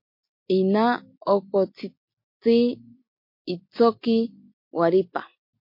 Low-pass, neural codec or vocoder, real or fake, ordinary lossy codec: 5.4 kHz; none; real; MP3, 32 kbps